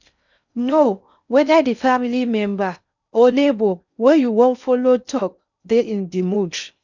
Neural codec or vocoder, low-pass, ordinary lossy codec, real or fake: codec, 16 kHz in and 24 kHz out, 0.6 kbps, FocalCodec, streaming, 4096 codes; 7.2 kHz; none; fake